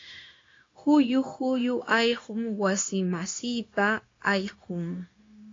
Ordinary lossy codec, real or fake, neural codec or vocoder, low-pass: AAC, 32 kbps; fake; codec, 16 kHz, 0.9 kbps, LongCat-Audio-Codec; 7.2 kHz